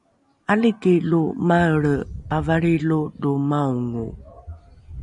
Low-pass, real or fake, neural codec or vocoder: 10.8 kHz; real; none